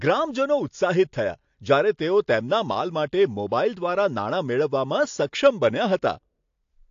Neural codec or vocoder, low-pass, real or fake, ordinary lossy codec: none; 7.2 kHz; real; AAC, 48 kbps